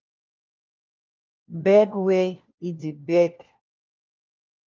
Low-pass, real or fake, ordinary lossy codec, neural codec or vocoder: 7.2 kHz; fake; Opus, 32 kbps; codec, 16 kHz, 1 kbps, FunCodec, trained on LibriTTS, 50 frames a second